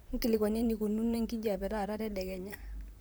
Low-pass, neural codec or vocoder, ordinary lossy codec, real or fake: none; vocoder, 44.1 kHz, 128 mel bands, Pupu-Vocoder; none; fake